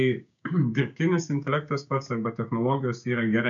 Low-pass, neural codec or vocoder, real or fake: 7.2 kHz; codec, 16 kHz, 6 kbps, DAC; fake